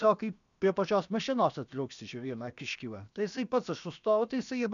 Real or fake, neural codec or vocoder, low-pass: fake; codec, 16 kHz, about 1 kbps, DyCAST, with the encoder's durations; 7.2 kHz